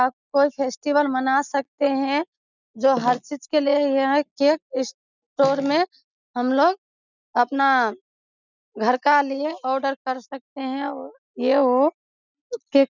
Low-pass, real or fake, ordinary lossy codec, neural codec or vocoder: 7.2 kHz; real; none; none